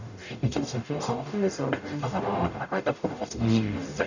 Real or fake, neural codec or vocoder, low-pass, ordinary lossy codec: fake; codec, 44.1 kHz, 0.9 kbps, DAC; 7.2 kHz; none